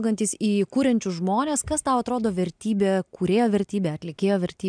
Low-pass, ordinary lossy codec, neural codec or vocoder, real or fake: 9.9 kHz; MP3, 96 kbps; none; real